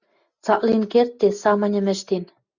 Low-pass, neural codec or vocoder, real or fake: 7.2 kHz; none; real